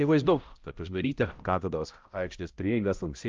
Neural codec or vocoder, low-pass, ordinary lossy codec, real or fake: codec, 16 kHz, 0.5 kbps, X-Codec, HuBERT features, trained on balanced general audio; 7.2 kHz; Opus, 24 kbps; fake